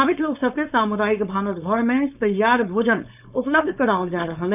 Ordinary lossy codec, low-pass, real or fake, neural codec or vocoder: none; 3.6 kHz; fake; codec, 16 kHz, 4.8 kbps, FACodec